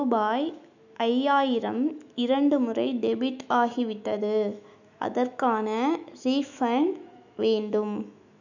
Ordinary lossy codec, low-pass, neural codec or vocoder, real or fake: none; 7.2 kHz; autoencoder, 48 kHz, 128 numbers a frame, DAC-VAE, trained on Japanese speech; fake